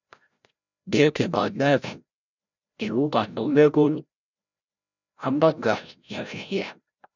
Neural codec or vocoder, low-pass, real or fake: codec, 16 kHz, 0.5 kbps, FreqCodec, larger model; 7.2 kHz; fake